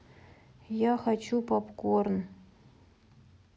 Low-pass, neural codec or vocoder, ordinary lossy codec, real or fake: none; none; none; real